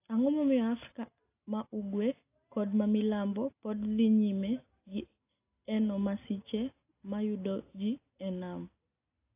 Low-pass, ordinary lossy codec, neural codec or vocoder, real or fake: 3.6 kHz; AAC, 24 kbps; none; real